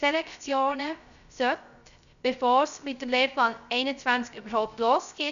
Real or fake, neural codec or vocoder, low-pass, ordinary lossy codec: fake; codec, 16 kHz, 0.3 kbps, FocalCodec; 7.2 kHz; none